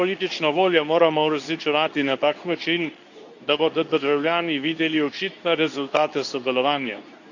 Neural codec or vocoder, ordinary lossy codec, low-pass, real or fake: codec, 24 kHz, 0.9 kbps, WavTokenizer, medium speech release version 2; none; 7.2 kHz; fake